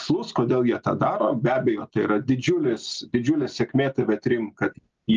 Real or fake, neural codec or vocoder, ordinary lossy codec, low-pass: real; none; Opus, 24 kbps; 7.2 kHz